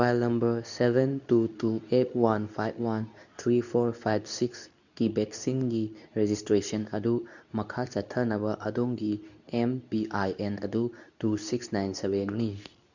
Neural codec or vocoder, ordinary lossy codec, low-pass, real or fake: codec, 24 kHz, 0.9 kbps, WavTokenizer, medium speech release version 2; none; 7.2 kHz; fake